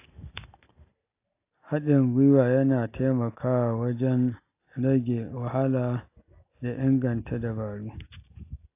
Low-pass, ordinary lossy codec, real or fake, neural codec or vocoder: 3.6 kHz; AAC, 24 kbps; real; none